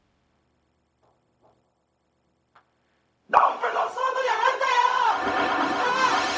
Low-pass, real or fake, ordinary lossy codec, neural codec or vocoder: none; fake; none; codec, 16 kHz, 0.4 kbps, LongCat-Audio-Codec